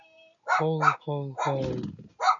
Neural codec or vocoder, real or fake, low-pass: none; real; 7.2 kHz